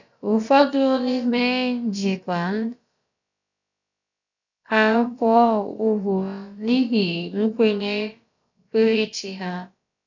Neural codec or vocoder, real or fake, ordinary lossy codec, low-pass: codec, 16 kHz, about 1 kbps, DyCAST, with the encoder's durations; fake; none; 7.2 kHz